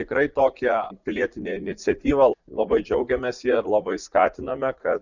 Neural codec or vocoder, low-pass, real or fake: vocoder, 44.1 kHz, 80 mel bands, Vocos; 7.2 kHz; fake